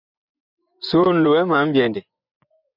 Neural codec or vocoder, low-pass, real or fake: vocoder, 24 kHz, 100 mel bands, Vocos; 5.4 kHz; fake